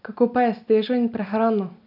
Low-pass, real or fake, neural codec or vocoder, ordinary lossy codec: 5.4 kHz; real; none; none